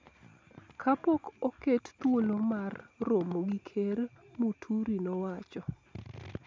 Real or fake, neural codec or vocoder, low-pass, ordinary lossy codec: real; none; none; none